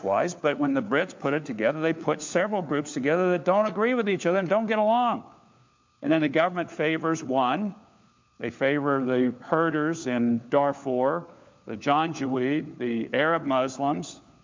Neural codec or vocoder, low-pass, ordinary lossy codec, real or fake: codec, 16 kHz, 4 kbps, FunCodec, trained on LibriTTS, 50 frames a second; 7.2 kHz; MP3, 64 kbps; fake